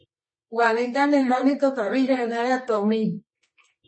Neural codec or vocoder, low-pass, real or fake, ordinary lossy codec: codec, 24 kHz, 0.9 kbps, WavTokenizer, medium music audio release; 9.9 kHz; fake; MP3, 32 kbps